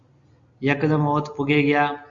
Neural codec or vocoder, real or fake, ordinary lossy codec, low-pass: none; real; Opus, 64 kbps; 7.2 kHz